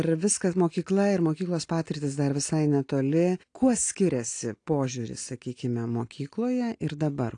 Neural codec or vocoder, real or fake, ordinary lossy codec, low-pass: none; real; AAC, 48 kbps; 9.9 kHz